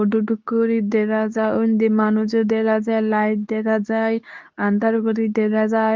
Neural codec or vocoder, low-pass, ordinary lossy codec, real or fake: codec, 24 kHz, 0.9 kbps, WavTokenizer, medium speech release version 2; 7.2 kHz; Opus, 24 kbps; fake